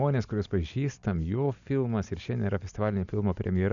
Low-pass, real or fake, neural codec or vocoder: 7.2 kHz; real; none